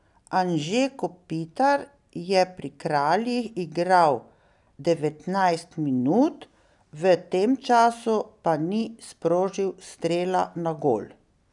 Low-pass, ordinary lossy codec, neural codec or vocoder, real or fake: 10.8 kHz; none; none; real